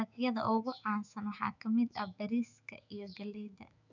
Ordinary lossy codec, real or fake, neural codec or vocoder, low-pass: none; fake; autoencoder, 48 kHz, 128 numbers a frame, DAC-VAE, trained on Japanese speech; 7.2 kHz